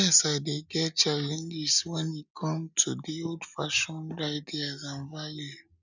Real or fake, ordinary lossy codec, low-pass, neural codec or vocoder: real; none; 7.2 kHz; none